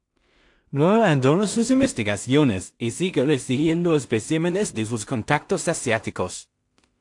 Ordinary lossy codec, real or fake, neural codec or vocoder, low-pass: AAC, 64 kbps; fake; codec, 16 kHz in and 24 kHz out, 0.4 kbps, LongCat-Audio-Codec, two codebook decoder; 10.8 kHz